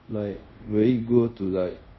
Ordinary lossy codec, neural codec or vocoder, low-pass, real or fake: MP3, 24 kbps; codec, 24 kHz, 0.5 kbps, DualCodec; 7.2 kHz; fake